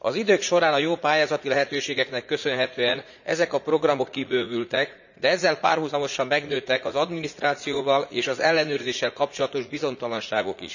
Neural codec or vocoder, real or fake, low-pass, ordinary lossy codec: vocoder, 44.1 kHz, 80 mel bands, Vocos; fake; 7.2 kHz; none